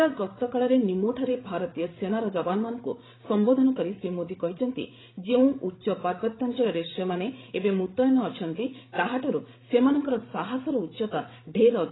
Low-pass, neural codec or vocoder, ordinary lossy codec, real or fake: 7.2 kHz; codec, 16 kHz, 16 kbps, FreqCodec, larger model; AAC, 16 kbps; fake